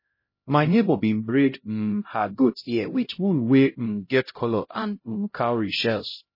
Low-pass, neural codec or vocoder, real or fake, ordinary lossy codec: 5.4 kHz; codec, 16 kHz, 0.5 kbps, X-Codec, HuBERT features, trained on LibriSpeech; fake; MP3, 24 kbps